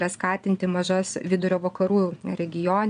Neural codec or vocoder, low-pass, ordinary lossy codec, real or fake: vocoder, 22.05 kHz, 80 mel bands, Vocos; 9.9 kHz; MP3, 96 kbps; fake